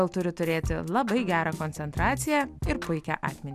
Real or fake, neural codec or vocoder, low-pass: real; none; 14.4 kHz